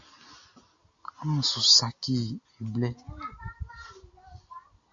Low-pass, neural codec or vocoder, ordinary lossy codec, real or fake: 7.2 kHz; none; AAC, 64 kbps; real